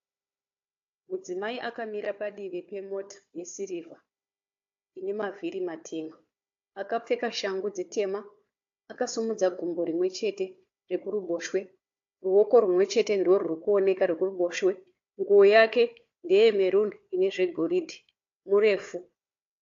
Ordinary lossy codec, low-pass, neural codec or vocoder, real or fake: AAC, 48 kbps; 7.2 kHz; codec, 16 kHz, 4 kbps, FunCodec, trained on Chinese and English, 50 frames a second; fake